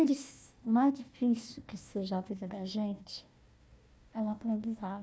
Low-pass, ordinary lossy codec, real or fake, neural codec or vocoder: none; none; fake; codec, 16 kHz, 1 kbps, FunCodec, trained on Chinese and English, 50 frames a second